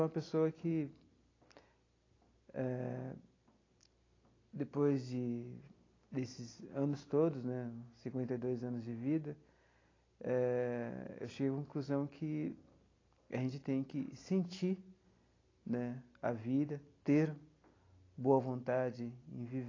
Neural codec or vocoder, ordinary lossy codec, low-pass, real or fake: none; AAC, 32 kbps; 7.2 kHz; real